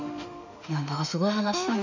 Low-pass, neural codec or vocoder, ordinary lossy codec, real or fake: 7.2 kHz; autoencoder, 48 kHz, 32 numbers a frame, DAC-VAE, trained on Japanese speech; none; fake